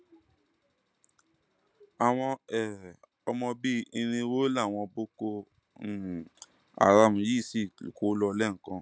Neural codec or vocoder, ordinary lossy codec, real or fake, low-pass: none; none; real; none